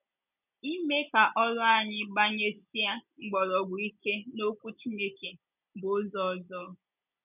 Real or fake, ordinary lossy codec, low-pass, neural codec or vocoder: real; none; 3.6 kHz; none